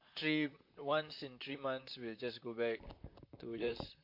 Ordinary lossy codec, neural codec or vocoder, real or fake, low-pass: MP3, 32 kbps; vocoder, 44.1 kHz, 128 mel bands, Pupu-Vocoder; fake; 5.4 kHz